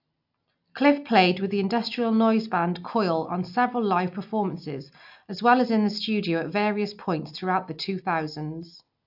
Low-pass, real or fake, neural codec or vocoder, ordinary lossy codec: 5.4 kHz; real; none; AAC, 48 kbps